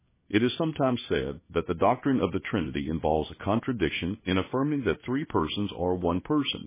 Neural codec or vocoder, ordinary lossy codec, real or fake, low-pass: codec, 16 kHz in and 24 kHz out, 1 kbps, XY-Tokenizer; MP3, 16 kbps; fake; 3.6 kHz